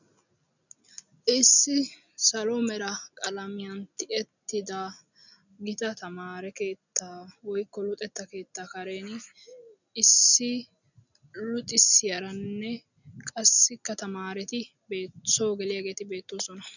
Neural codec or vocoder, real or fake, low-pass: none; real; 7.2 kHz